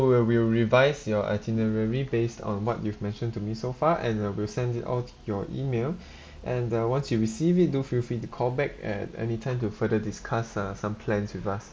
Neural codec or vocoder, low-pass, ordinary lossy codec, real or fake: none; none; none; real